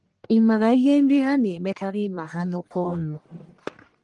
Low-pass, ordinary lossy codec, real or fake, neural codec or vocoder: 10.8 kHz; Opus, 24 kbps; fake; codec, 44.1 kHz, 1.7 kbps, Pupu-Codec